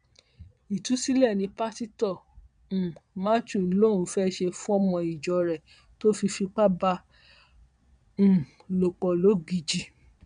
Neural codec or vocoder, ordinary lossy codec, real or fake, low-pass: vocoder, 22.05 kHz, 80 mel bands, Vocos; none; fake; 9.9 kHz